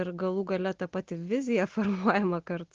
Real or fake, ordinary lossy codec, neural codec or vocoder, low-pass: real; Opus, 16 kbps; none; 7.2 kHz